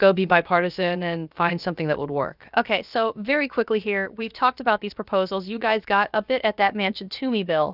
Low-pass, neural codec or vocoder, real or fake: 5.4 kHz; codec, 16 kHz, about 1 kbps, DyCAST, with the encoder's durations; fake